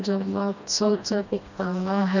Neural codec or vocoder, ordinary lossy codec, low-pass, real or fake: codec, 16 kHz, 1 kbps, FreqCodec, smaller model; none; 7.2 kHz; fake